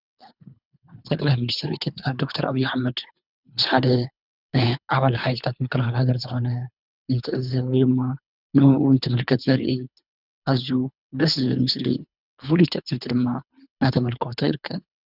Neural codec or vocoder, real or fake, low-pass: codec, 24 kHz, 3 kbps, HILCodec; fake; 5.4 kHz